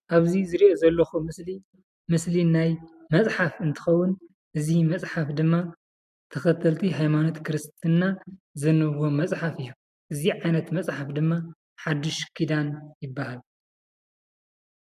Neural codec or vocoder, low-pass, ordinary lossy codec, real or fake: none; 14.4 kHz; MP3, 96 kbps; real